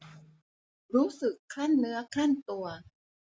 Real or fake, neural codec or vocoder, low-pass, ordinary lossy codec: real; none; none; none